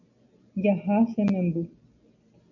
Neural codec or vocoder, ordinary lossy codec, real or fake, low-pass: none; AAC, 48 kbps; real; 7.2 kHz